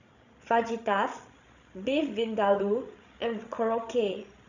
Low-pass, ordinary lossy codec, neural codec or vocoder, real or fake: 7.2 kHz; none; codec, 16 kHz, 16 kbps, FreqCodec, larger model; fake